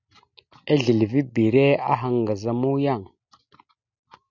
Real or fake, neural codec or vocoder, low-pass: real; none; 7.2 kHz